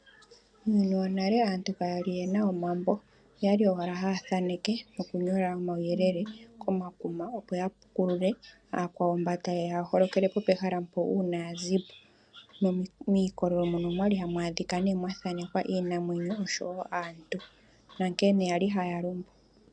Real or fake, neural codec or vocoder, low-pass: real; none; 9.9 kHz